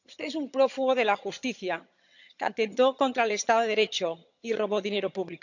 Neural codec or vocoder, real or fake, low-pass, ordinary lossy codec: vocoder, 22.05 kHz, 80 mel bands, HiFi-GAN; fake; 7.2 kHz; none